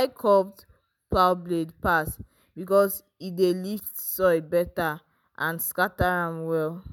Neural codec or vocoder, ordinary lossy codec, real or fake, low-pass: none; none; real; none